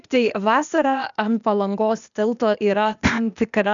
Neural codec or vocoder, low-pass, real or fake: codec, 16 kHz, 0.8 kbps, ZipCodec; 7.2 kHz; fake